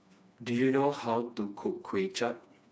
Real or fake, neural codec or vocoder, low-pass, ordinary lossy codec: fake; codec, 16 kHz, 2 kbps, FreqCodec, smaller model; none; none